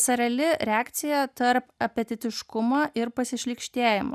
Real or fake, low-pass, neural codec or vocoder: real; 14.4 kHz; none